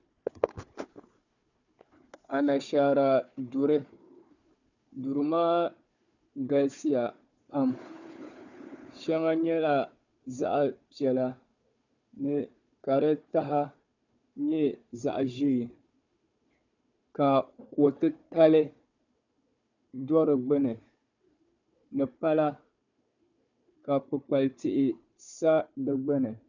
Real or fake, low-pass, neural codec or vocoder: fake; 7.2 kHz; codec, 16 kHz, 4 kbps, FunCodec, trained on Chinese and English, 50 frames a second